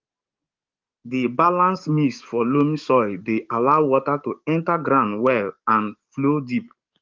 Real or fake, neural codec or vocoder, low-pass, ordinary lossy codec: fake; codec, 44.1 kHz, 7.8 kbps, DAC; 7.2 kHz; Opus, 32 kbps